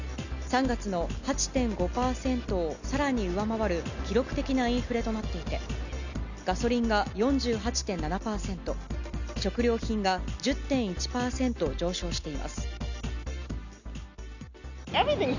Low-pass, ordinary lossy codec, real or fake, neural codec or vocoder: 7.2 kHz; none; real; none